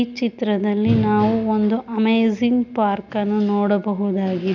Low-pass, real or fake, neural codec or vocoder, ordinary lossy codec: 7.2 kHz; real; none; none